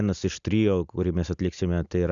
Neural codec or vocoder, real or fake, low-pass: none; real; 7.2 kHz